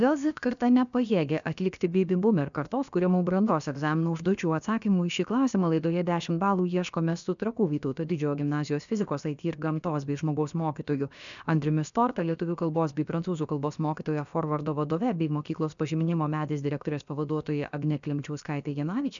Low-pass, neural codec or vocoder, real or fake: 7.2 kHz; codec, 16 kHz, about 1 kbps, DyCAST, with the encoder's durations; fake